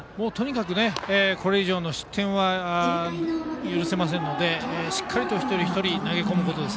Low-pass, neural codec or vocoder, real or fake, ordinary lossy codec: none; none; real; none